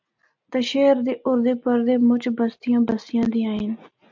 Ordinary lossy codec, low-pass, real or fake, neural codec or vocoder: MP3, 64 kbps; 7.2 kHz; real; none